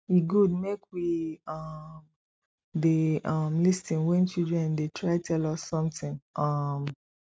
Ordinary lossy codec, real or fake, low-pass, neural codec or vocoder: none; real; none; none